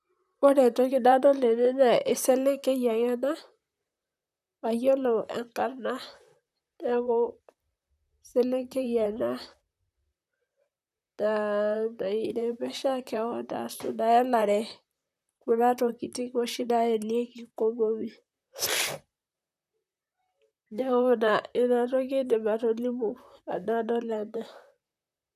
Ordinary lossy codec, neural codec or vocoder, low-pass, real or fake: none; vocoder, 44.1 kHz, 128 mel bands, Pupu-Vocoder; 14.4 kHz; fake